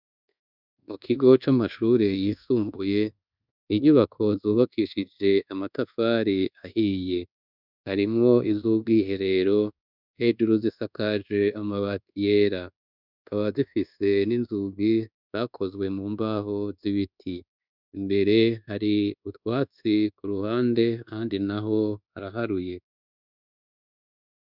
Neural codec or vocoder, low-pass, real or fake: codec, 24 kHz, 1.2 kbps, DualCodec; 5.4 kHz; fake